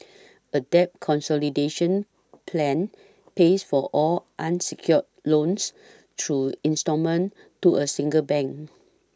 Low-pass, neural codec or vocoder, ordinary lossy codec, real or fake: none; none; none; real